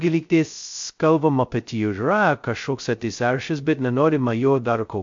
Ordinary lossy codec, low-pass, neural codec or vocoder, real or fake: MP3, 48 kbps; 7.2 kHz; codec, 16 kHz, 0.2 kbps, FocalCodec; fake